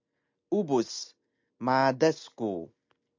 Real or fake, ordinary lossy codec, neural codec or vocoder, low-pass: real; MP3, 64 kbps; none; 7.2 kHz